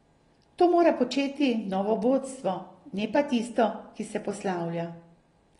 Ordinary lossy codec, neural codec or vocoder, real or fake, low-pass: AAC, 32 kbps; none; real; 10.8 kHz